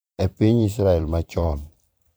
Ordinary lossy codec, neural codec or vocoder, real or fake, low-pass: none; vocoder, 44.1 kHz, 128 mel bands every 512 samples, BigVGAN v2; fake; none